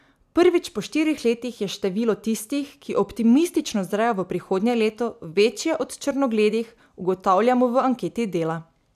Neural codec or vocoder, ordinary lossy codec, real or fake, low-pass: none; none; real; 14.4 kHz